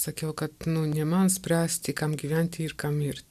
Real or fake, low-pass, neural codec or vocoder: real; 14.4 kHz; none